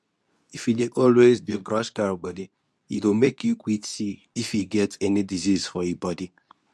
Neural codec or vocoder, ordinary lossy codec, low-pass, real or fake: codec, 24 kHz, 0.9 kbps, WavTokenizer, medium speech release version 2; none; none; fake